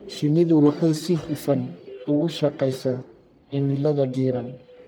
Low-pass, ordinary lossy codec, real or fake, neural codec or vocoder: none; none; fake; codec, 44.1 kHz, 1.7 kbps, Pupu-Codec